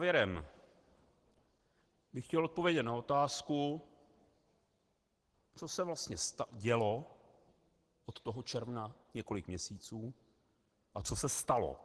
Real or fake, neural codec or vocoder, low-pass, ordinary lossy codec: real; none; 10.8 kHz; Opus, 16 kbps